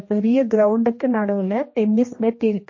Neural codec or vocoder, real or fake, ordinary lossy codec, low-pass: codec, 16 kHz, 1 kbps, X-Codec, HuBERT features, trained on general audio; fake; MP3, 32 kbps; 7.2 kHz